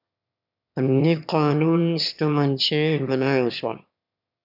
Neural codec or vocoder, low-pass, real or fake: autoencoder, 22.05 kHz, a latent of 192 numbers a frame, VITS, trained on one speaker; 5.4 kHz; fake